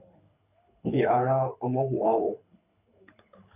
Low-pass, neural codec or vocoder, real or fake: 3.6 kHz; codec, 24 kHz, 6 kbps, HILCodec; fake